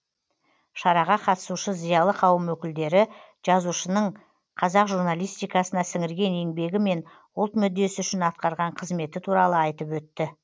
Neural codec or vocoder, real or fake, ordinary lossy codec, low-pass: none; real; none; none